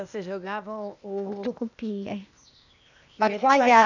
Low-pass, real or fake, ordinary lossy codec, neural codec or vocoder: 7.2 kHz; fake; none; codec, 16 kHz, 0.8 kbps, ZipCodec